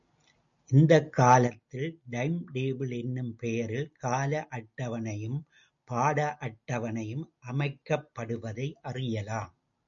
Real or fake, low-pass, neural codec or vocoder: real; 7.2 kHz; none